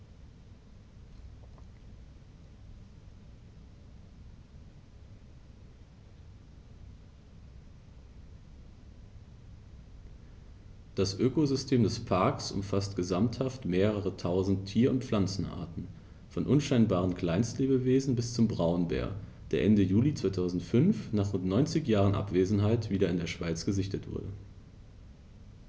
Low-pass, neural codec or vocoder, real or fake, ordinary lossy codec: none; none; real; none